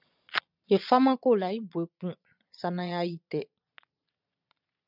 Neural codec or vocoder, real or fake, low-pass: vocoder, 22.05 kHz, 80 mel bands, Vocos; fake; 5.4 kHz